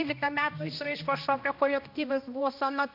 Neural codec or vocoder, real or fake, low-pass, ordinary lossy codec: codec, 16 kHz, 1 kbps, X-Codec, HuBERT features, trained on balanced general audio; fake; 5.4 kHz; MP3, 48 kbps